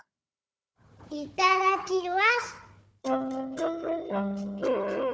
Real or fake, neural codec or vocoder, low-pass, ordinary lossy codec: fake; codec, 16 kHz, 4 kbps, FunCodec, trained on Chinese and English, 50 frames a second; none; none